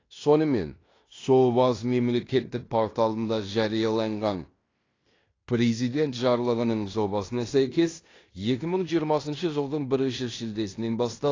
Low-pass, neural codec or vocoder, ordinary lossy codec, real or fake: 7.2 kHz; codec, 16 kHz in and 24 kHz out, 0.9 kbps, LongCat-Audio-Codec, fine tuned four codebook decoder; AAC, 32 kbps; fake